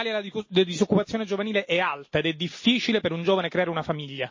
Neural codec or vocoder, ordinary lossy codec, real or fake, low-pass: none; MP3, 32 kbps; real; 7.2 kHz